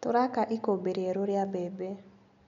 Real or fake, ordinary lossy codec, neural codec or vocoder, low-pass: real; none; none; 7.2 kHz